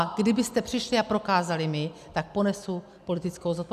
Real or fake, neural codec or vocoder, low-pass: real; none; 14.4 kHz